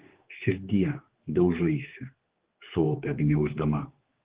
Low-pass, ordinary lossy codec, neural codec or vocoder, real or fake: 3.6 kHz; Opus, 16 kbps; codec, 16 kHz, 4 kbps, X-Codec, HuBERT features, trained on general audio; fake